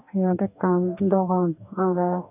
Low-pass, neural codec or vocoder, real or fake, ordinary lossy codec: 3.6 kHz; codec, 44.1 kHz, 2.6 kbps, DAC; fake; none